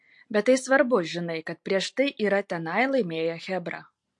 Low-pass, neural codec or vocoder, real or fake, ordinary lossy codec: 10.8 kHz; none; real; MP3, 48 kbps